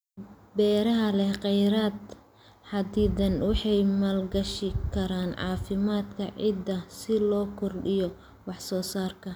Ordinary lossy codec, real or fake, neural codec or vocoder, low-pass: none; real; none; none